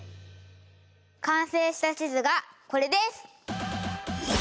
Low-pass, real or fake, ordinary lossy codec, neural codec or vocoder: none; real; none; none